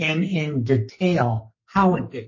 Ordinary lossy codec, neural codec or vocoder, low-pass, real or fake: MP3, 32 kbps; codec, 44.1 kHz, 2.6 kbps, SNAC; 7.2 kHz; fake